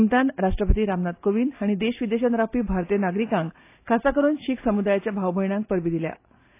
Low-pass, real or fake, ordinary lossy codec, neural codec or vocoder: 3.6 kHz; real; AAC, 24 kbps; none